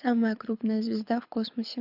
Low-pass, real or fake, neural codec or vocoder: 5.4 kHz; fake; codec, 24 kHz, 6 kbps, HILCodec